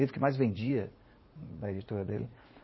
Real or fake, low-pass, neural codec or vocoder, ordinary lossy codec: fake; 7.2 kHz; vocoder, 44.1 kHz, 80 mel bands, Vocos; MP3, 24 kbps